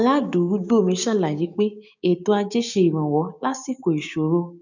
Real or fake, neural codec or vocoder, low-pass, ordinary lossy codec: fake; codec, 16 kHz, 6 kbps, DAC; 7.2 kHz; none